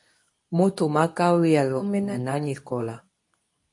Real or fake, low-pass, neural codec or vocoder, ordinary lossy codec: fake; 10.8 kHz; codec, 24 kHz, 0.9 kbps, WavTokenizer, medium speech release version 2; MP3, 48 kbps